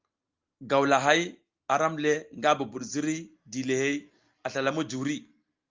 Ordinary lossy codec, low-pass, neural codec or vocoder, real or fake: Opus, 24 kbps; 7.2 kHz; none; real